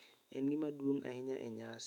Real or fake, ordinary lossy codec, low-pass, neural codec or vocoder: fake; none; 19.8 kHz; autoencoder, 48 kHz, 128 numbers a frame, DAC-VAE, trained on Japanese speech